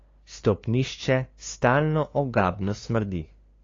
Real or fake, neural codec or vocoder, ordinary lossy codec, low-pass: fake; codec, 16 kHz, 2 kbps, FunCodec, trained on LibriTTS, 25 frames a second; AAC, 32 kbps; 7.2 kHz